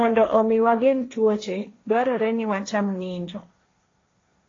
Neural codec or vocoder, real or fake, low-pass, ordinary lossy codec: codec, 16 kHz, 1.1 kbps, Voila-Tokenizer; fake; 7.2 kHz; AAC, 32 kbps